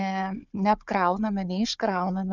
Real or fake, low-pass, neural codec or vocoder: fake; 7.2 kHz; codec, 16 kHz, 4 kbps, FunCodec, trained on Chinese and English, 50 frames a second